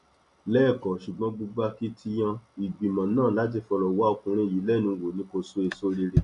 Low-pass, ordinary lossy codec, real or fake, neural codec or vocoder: 10.8 kHz; none; real; none